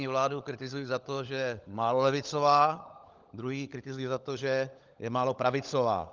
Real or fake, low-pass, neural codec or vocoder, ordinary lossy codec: fake; 7.2 kHz; codec, 16 kHz, 16 kbps, FunCodec, trained on LibriTTS, 50 frames a second; Opus, 24 kbps